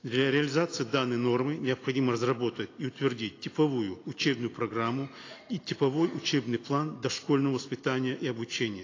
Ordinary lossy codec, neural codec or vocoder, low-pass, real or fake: AAC, 32 kbps; none; 7.2 kHz; real